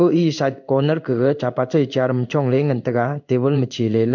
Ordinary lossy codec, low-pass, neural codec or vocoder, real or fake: none; 7.2 kHz; codec, 16 kHz in and 24 kHz out, 1 kbps, XY-Tokenizer; fake